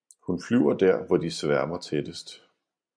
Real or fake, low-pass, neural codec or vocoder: real; 9.9 kHz; none